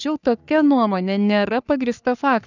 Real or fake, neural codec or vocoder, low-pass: fake; codec, 44.1 kHz, 3.4 kbps, Pupu-Codec; 7.2 kHz